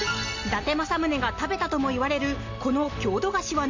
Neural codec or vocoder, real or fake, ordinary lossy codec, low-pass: none; real; AAC, 48 kbps; 7.2 kHz